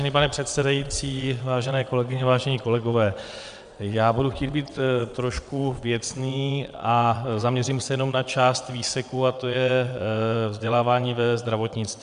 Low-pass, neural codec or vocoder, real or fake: 9.9 kHz; vocoder, 22.05 kHz, 80 mel bands, WaveNeXt; fake